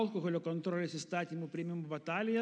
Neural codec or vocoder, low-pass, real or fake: none; 9.9 kHz; real